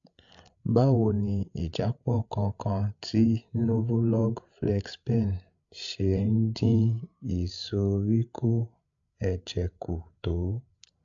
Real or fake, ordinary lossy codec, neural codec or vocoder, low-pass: fake; AAC, 64 kbps; codec, 16 kHz, 8 kbps, FreqCodec, larger model; 7.2 kHz